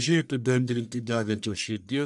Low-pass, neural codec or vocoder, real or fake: 10.8 kHz; codec, 44.1 kHz, 1.7 kbps, Pupu-Codec; fake